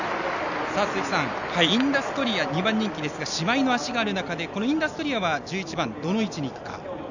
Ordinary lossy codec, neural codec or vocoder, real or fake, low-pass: none; none; real; 7.2 kHz